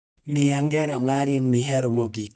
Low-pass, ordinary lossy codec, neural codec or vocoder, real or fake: 10.8 kHz; none; codec, 24 kHz, 0.9 kbps, WavTokenizer, medium music audio release; fake